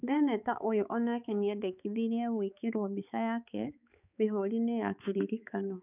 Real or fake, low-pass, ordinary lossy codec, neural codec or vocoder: fake; 3.6 kHz; none; codec, 16 kHz, 4 kbps, X-Codec, HuBERT features, trained on balanced general audio